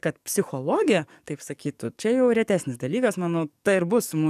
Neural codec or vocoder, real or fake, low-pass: codec, 44.1 kHz, 7.8 kbps, DAC; fake; 14.4 kHz